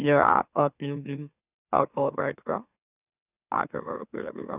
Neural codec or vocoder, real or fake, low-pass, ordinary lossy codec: autoencoder, 44.1 kHz, a latent of 192 numbers a frame, MeloTTS; fake; 3.6 kHz; none